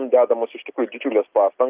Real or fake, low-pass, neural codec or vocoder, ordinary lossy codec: real; 3.6 kHz; none; Opus, 24 kbps